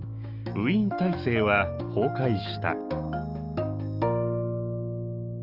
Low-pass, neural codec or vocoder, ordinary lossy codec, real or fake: 5.4 kHz; autoencoder, 48 kHz, 128 numbers a frame, DAC-VAE, trained on Japanese speech; Opus, 32 kbps; fake